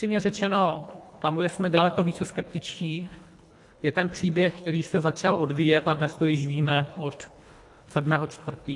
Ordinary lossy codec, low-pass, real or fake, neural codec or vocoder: AAC, 64 kbps; 10.8 kHz; fake; codec, 24 kHz, 1.5 kbps, HILCodec